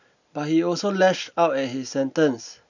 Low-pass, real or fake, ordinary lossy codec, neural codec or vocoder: 7.2 kHz; real; none; none